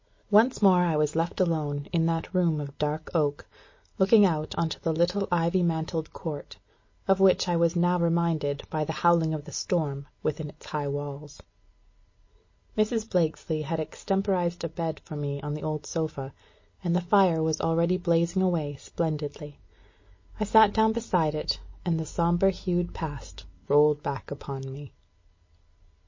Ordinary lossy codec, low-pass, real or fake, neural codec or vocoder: MP3, 32 kbps; 7.2 kHz; real; none